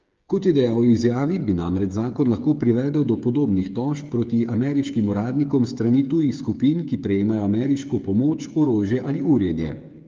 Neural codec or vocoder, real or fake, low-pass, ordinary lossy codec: codec, 16 kHz, 8 kbps, FreqCodec, smaller model; fake; 7.2 kHz; Opus, 32 kbps